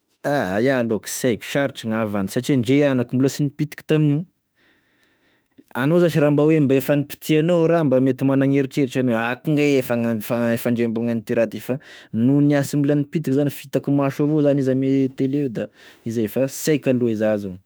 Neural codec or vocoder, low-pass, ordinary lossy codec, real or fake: autoencoder, 48 kHz, 32 numbers a frame, DAC-VAE, trained on Japanese speech; none; none; fake